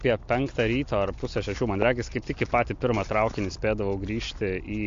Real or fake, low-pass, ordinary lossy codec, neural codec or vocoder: real; 7.2 kHz; MP3, 48 kbps; none